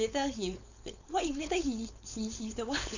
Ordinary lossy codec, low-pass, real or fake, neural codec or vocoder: none; 7.2 kHz; fake; codec, 16 kHz, 4.8 kbps, FACodec